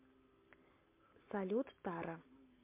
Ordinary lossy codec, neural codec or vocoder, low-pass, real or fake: MP3, 24 kbps; none; 3.6 kHz; real